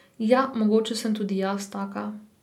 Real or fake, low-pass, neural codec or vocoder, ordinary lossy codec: real; 19.8 kHz; none; none